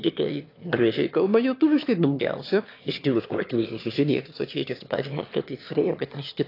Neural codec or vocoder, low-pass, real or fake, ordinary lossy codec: autoencoder, 22.05 kHz, a latent of 192 numbers a frame, VITS, trained on one speaker; 5.4 kHz; fake; AAC, 32 kbps